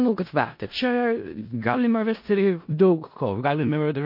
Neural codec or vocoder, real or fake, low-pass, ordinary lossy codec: codec, 16 kHz in and 24 kHz out, 0.4 kbps, LongCat-Audio-Codec, four codebook decoder; fake; 5.4 kHz; MP3, 32 kbps